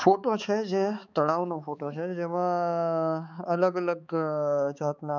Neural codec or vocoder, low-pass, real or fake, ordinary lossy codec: codec, 16 kHz, 4 kbps, X-Codec, HuBERT features, trained on balanced general audio; 7.2 kHz; fake; none